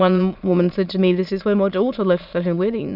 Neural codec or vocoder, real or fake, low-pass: autoencoder, 22.05 kHz, a latent of 192 numbers a frame, VITS, trained on many speakers; fake; 5.4 kHz